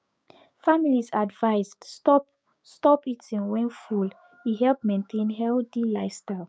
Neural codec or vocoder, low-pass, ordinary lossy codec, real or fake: codec, 16 kHz, 6 kbps, DAC; none; none; fake